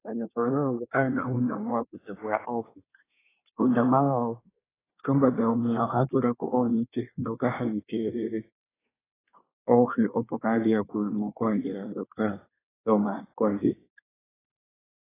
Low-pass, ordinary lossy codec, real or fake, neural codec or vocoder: 3.6 kHz; AAC, 16 kbps; fake; codec, 24 kHz, 1 kbps, SNAC